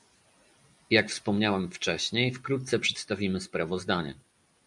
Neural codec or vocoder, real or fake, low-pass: none; real; 10.8 kHz